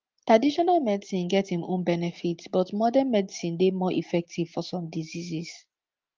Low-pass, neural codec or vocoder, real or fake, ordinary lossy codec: 7.2 kHz; none; real; Opus, 32 kbps